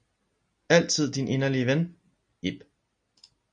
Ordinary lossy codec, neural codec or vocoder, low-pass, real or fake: MP3, 48 kbps; none; 9.9 kHz; real